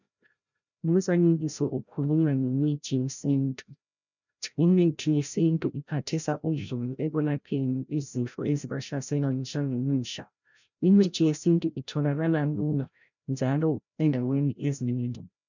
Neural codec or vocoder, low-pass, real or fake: codec, 16 kHz, 0.5 kbps, FreqCodec, larger model; 7.2 kHz; fake